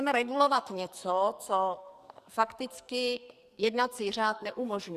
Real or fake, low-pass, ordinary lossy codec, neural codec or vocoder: fake; 14.4 kHz; Opus, 64 kbps; codec, 44.1 kHz, 2.6 kbps, SNAC